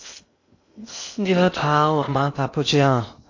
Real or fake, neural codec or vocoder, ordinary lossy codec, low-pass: fake; codec, 16 kHz in and 24 kHz out, 0.6 kbps, FocalCodec, streaming, 2048 codes; AAC, 48 kbps; 7.2 kHz